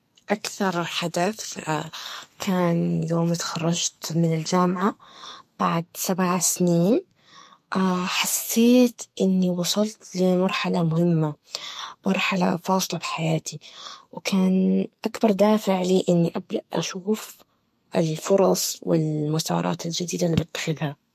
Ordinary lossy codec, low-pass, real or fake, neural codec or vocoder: MP3, 64 kbps; 14.4 kHz; fake; codec, 44.1 kHz, 2.6 kbps, SNAC